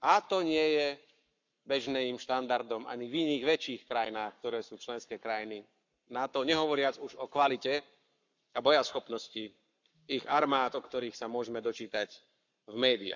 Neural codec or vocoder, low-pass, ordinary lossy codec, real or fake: codec, 44.1 kHz, 7.8 kbps, Pupu-Codec; 7.2 kHz; none; fake